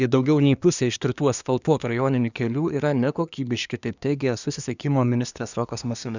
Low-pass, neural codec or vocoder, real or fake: 7.2 kHz; codec, 24 kHz, 1 kbps, SNAC; fake